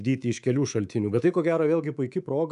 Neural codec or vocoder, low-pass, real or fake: codec, 24 kHz, 3.1 kbps, DualCodec; 10.8 kHz; fake